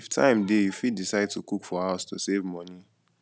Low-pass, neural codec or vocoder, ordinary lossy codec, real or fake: none; none; none; real